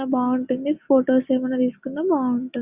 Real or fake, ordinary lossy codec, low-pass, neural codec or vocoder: real; Opus, 64 kbps; 3.6 kHz; none